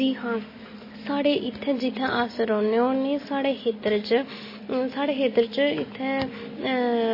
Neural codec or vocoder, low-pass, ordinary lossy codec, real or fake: none; 5.4 kHz; MP3, 24 kbps; real